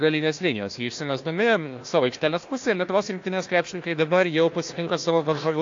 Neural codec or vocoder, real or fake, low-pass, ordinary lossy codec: codec, 16 kHz, 1 kbps, FunCodec, trained on Chinese and English, 50 frames a second; fake; 7.2 kHz; AAC, 48 kbps